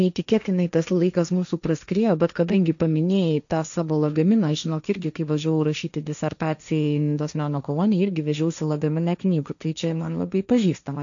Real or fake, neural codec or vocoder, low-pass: fake; codec, 16 kHz, 1.1 kbps, Voila-Tokenizer; 7.2 kHz